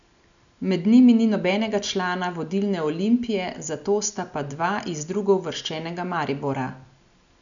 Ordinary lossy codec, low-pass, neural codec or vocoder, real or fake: none; 7.2 kHz; none; real